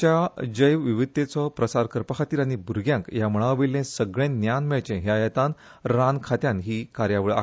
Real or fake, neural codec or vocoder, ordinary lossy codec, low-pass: real; none; none; none